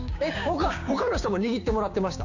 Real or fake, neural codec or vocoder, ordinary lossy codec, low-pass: fake; codec, 16 kHz, 6 kbps, DAC; none; 7.2 kHz